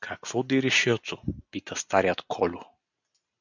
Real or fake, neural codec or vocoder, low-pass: real; none; 7.2 kHz